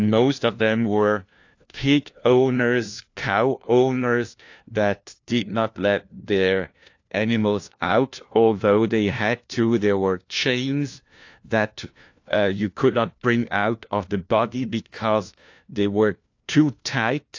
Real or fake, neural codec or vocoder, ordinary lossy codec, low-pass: fake; codec, 16 kHz, 1 kbps, FunCodec, trained on LibriTTS, 50 frames a second; AAC, 48 kbps; 7.2 kHz